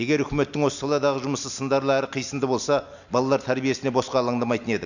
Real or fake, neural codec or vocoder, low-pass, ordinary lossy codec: real; none; 7.2 kHz; none